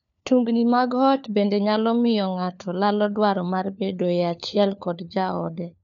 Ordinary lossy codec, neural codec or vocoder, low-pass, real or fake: none; codec, 16 kHz, 4 kbps, FunCodec, trained on LibriTTS, 50 frames a second; 7.2 kHz; fake